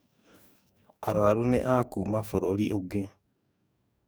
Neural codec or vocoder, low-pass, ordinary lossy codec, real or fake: codec, 44.1 kHz, 2.6 kbps, DAC; none; none; fake